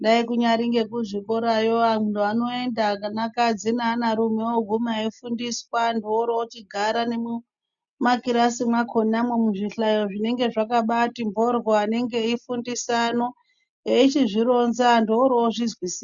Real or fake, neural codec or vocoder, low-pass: real; none; 7.2 kHz